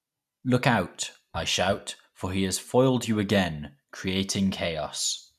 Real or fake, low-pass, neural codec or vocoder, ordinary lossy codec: real; 14.4 kHz; none; none